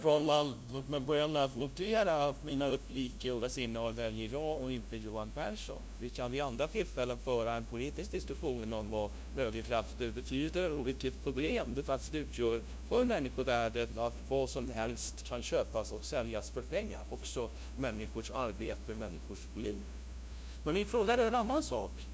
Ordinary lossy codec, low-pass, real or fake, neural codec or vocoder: none; none; fake; codec, 16 kHz, 0.5 kbps, FunCodec, trained on LibriTTS, 25 frames a second